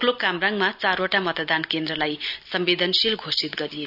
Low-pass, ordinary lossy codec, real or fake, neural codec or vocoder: 5.4 kHz; none; real; none